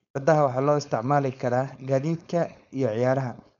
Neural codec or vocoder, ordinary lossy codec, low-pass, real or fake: codec, 16 kHz, 4.8 kbps, FACodec; none; 7.2 kHz; fake